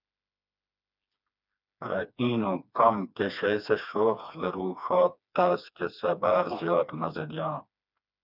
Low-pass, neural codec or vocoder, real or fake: 5.4 kHz; codec, 16 kHz, 2 kbps, FreqCodec, smaller model; fake